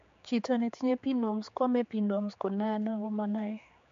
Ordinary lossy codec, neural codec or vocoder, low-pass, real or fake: MP3, 48 kbps; codec, 16 kHz, 4 kbps, X-Codec, HuBERT features, trained on general audio; 7.2 kHz; fake